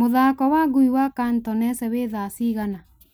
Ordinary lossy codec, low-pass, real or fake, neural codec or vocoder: none; none; real; none